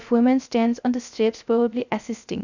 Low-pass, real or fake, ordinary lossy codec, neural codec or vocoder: 7.2 kHz; fake; none; codec, 16 kHz, 0.3 kbps, FocalCodec